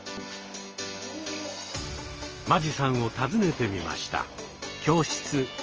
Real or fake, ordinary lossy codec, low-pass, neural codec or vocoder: real; Opus, 24 kbps; 7.2 kHz; none